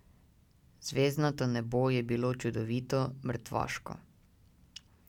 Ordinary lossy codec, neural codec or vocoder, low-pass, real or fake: none; none; 19.8 kHz; real